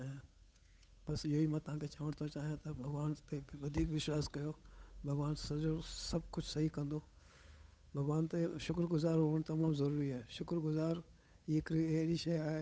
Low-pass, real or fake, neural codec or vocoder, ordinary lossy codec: none; fake; codec, 16 kHz, 8 kbps, FunCodec, trained on Chinese and English, 25 frames a second; none